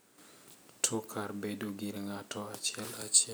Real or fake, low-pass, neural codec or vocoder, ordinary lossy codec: real; none; none; none